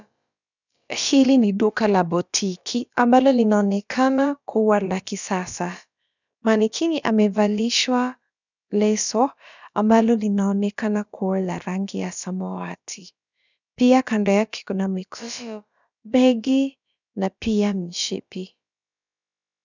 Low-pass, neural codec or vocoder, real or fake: 7.2 kHz; codec, 16 kHz, about 1 kbps, DyCAST, with the encoder's durations; fake